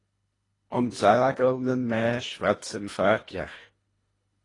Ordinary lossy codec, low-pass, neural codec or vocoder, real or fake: AAC, 32 kbps; 10.8 kHz; codec, 24 kHz, 1.5 kbps, HILCodec; fake